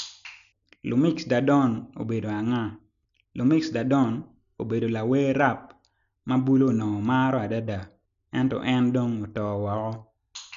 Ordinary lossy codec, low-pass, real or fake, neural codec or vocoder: none; 7.2 kHz; real; none